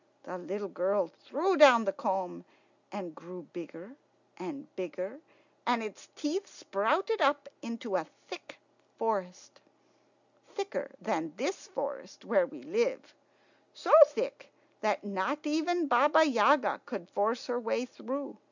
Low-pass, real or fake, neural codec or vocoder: 7.2 kHz; real; none